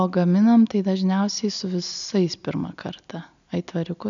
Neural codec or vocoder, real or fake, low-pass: none; real; 7.2 kHz